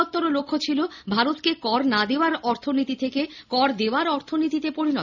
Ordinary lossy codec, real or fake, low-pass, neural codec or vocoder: none; real; 7.2 kHz; none